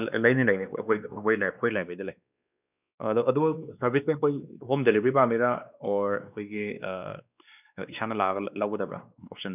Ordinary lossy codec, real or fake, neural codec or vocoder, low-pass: none; fake; codec, 16 kHz, 2 kbps, X-Codec, WavLM features, trained on Multilingual LibriSpeech; 3.6 kHz